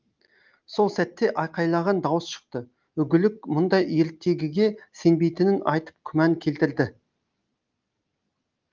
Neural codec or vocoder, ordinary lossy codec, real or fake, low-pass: none; Opus, 32 kbps; real; 7.2 kHz